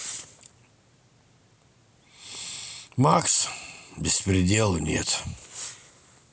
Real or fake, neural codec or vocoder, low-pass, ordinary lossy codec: real; none; none; none